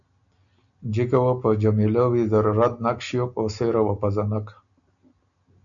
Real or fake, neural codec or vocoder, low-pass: real; none; 7.2 kHz